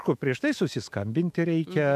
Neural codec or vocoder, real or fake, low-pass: autoencoder, 48 kHz, 128 numbers a frame, DAC-VAE, trained on Japanese speech; fake; 14.4 kHz